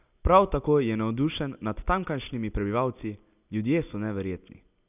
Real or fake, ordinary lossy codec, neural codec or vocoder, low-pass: real; none; none; 3.6 kHz